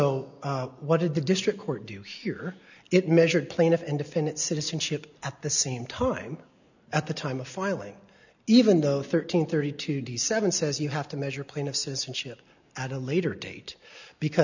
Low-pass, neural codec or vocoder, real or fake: 7.2 kHz; none; real